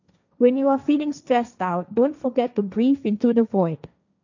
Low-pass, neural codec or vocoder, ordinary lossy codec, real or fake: 7.2 kHz; codec, 16 kHz, 1.1 kbps, Voila-Tokenizer; none; fake